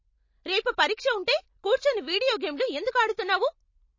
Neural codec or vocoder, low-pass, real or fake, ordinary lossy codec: none; 7.2 kHz; real; MP3, 32 kbps